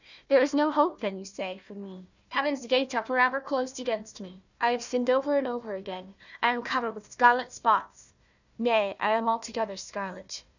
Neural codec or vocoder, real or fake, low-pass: codec, 16 kHz, 1 kbps, FunCodec, trained on Chinese and English, 50 frames a second; fake; 7.2 kHz